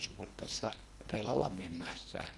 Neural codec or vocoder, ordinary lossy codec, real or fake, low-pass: codec, 24 kHz, 1.5 kbps, HILCodec; none; fake; none